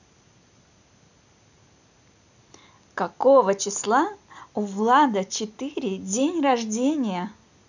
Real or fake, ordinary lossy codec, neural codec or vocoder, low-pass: real; none; none; 7.2 kHz